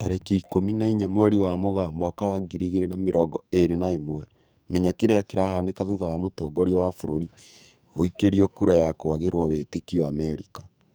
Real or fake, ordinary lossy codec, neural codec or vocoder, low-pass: fake; none; codec, 44.1 kHz, 2.6 kbps, SNAC; none